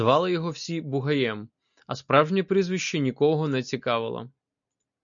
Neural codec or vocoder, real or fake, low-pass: none; real; 7.2 kHz